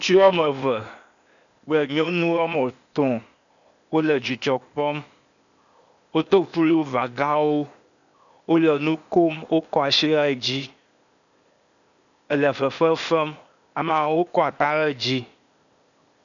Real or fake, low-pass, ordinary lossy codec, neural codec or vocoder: fake; 7.2 kHz; MP3, 96 kbps; codec, 16 kHz, 0.8 kbps, ZipCodec